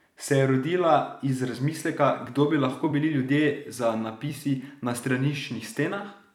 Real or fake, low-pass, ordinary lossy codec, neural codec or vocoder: fake; 19.8 kHz; none; vocoder, 44.1 kHz, 128 mel bands every 256 samples, BigVGAN v2